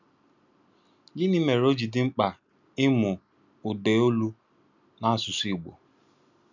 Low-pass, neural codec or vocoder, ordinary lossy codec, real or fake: 7.2 kHz; none; none; real